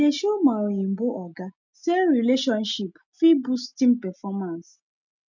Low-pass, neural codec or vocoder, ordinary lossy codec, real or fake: 7.2 kHz; none; none; real